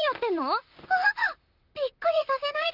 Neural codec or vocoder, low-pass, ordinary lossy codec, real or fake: vocoder, 44.1 kHz, 128 mel bands, Pupu-Vocoder; 5.4 kHz; Opus, 24 kbps; fake